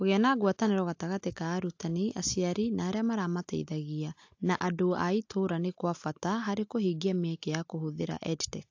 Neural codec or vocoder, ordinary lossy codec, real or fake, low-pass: none; AAC, 48 kbps; real; 7.2 kHz